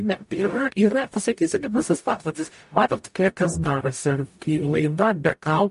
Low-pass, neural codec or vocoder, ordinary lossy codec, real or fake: 14.4 kHz; codec, 44.1 kHz, 0.9 kbps, DAC; MP3, 48 kbps; fake